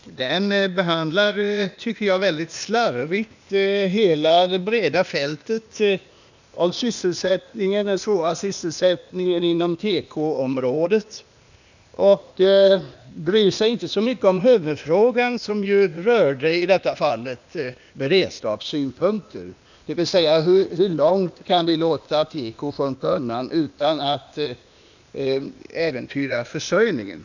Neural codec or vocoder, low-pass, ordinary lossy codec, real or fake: codec, 16 kHz, 0.8 kbps, ZipCodec; 7.2 kHz; none; fake